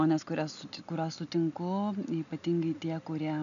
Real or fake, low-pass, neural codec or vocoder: real; 7.2 kHz; none